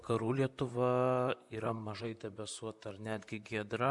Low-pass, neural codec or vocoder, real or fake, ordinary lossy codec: 10.8 kHz; vocoder, 44.1 kHz, 128 mel bands, Pupu-Vocoder; fake; MP3, 96 kbps